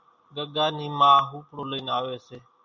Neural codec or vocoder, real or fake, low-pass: none; real; 7.2 kHz